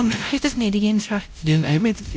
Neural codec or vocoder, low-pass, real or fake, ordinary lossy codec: codec, 16 kHz, 0.5 kbps, X-Codec, WavLM features, trained on Multilingual LibriSpeech; none; fake; none